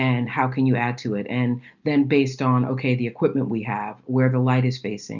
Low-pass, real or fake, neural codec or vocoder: 7.2 kHz; real; none